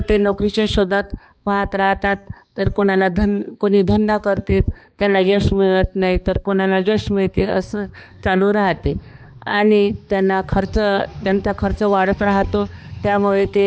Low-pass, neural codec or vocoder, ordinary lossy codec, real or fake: none; codec, 16 kHz, 2 kbps, X-Codec, HuBERT features, trained on balanced general audio; none; fake